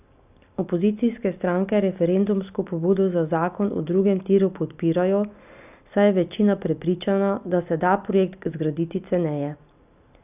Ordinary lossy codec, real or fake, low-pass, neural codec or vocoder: none; real; 3.6 kHz; none